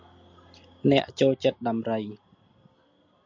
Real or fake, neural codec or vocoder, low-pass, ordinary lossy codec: real; none; 7.2 kHz; MP3, 64 kbps